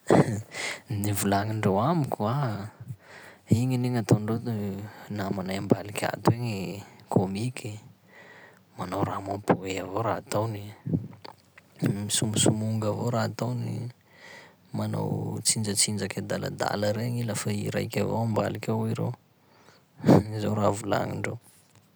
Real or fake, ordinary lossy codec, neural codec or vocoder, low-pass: real; none; none; none